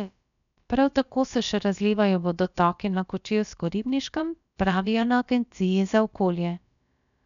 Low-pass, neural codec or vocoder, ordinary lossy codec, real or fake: 7.2 kHz; codec, 16 kHz, about 1 kbps, DyCAST, with the encoder's durations; none; fake